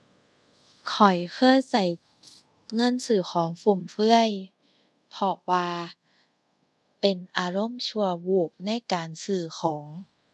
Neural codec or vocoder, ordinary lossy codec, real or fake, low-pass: codec, 24 kHz, 0.5 kbps, DualCodec; none; fake; none